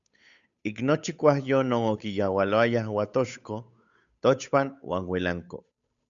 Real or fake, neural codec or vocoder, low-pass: fake; codec, 16 kHz, 8 kbps, FunCodec, trained on Chinese and English, 25 frames a second; 7.2 kHz